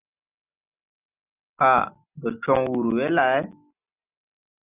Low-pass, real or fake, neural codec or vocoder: 3.6 kHz; real; none